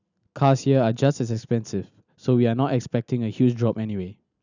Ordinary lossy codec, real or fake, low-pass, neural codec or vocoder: none; real; 7.2 kHz; none